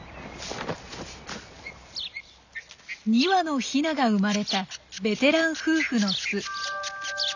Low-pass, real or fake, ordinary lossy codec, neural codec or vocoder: 7.2 kHz; real; none; none